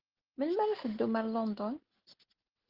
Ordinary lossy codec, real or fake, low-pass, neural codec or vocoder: Opus, 16 kbps; real; 5.4 kHz; none